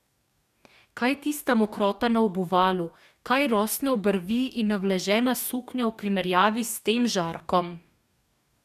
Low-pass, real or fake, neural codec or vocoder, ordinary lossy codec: 14.4 kHz; fake; codec, 44.1 kHz, 2.6 kbps, DAC; none